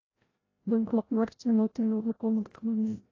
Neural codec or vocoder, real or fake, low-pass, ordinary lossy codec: codec, 16 kHz, 0.5 kbps, FreqCodec, larger model; fake; 7.2 kHz; AAC, 32 kbps